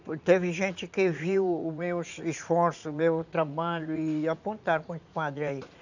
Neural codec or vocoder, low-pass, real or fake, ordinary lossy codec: vocoder, 44.1 kHz, 80 mel bands, Vocos; 7.2 kHz; fake; none